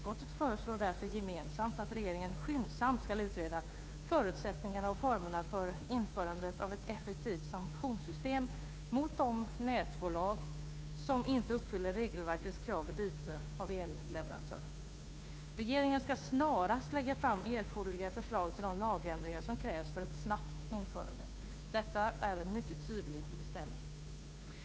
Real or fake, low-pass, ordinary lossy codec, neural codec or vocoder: fake; none; none; codec, 16 kHz, 2 kbps, FunCodec, trained on Chinese and English, 25 frames a second